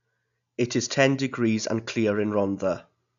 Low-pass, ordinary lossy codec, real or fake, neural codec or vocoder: 7.2 kHz; none; real; none